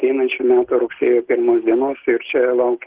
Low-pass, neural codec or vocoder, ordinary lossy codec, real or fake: 3.6 kHz; none; Opus, 16 kbps; real